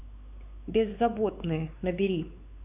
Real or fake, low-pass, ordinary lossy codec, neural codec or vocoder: fake; 3.6 kHz; AAC, 32 kbps; codec, 16 kHz, 4 kbps, X-Codec, WavLM features, trained on Multilingual LibriSpeech